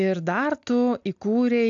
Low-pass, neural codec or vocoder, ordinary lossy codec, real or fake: 7.2 kHz; none; AAC, 64 kbps; real